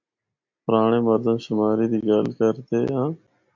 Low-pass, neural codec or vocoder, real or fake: 7.2 kHz; none; real